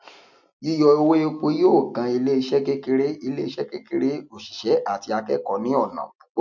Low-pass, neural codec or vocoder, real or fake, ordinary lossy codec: 7.2 kHz; none; real; none